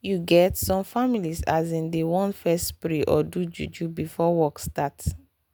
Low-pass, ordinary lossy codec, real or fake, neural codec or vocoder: none; none; real; none